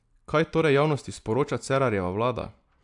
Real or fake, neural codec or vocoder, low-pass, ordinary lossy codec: real; none; 10.8 kHz; AAC, 64 kbps